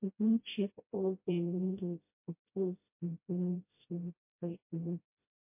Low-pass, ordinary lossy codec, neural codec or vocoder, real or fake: 3.6 kHz; MP3, 24 kbps; codec, 16 kHz, 0.5 kbps, FreqCodec, smaller model; fake